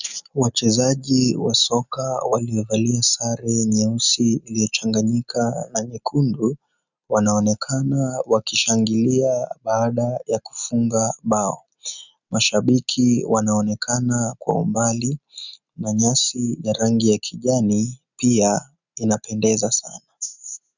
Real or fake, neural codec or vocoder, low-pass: real; none; 7.2 kHz